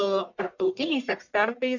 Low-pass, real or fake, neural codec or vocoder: 7.2 kHz; fake; codec, 44.1 kHz, 1.7 kbps, Pupu-Codec